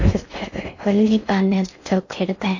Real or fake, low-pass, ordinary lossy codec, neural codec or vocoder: fake; 7.2 kHz; AAC, 48 kbps; codec, 16 kHz in and 24 kHz out, 0.6 kbps, FocalCodec, streaming, 4096 codes